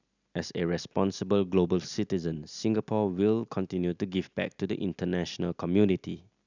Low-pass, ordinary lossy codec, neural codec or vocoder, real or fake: 7.2 kHz; none; none; real